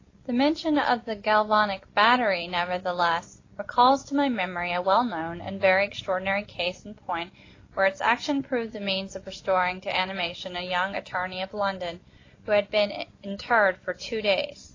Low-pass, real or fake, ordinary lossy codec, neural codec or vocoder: 7.2 kHz; real; AAC, 32 kbps; none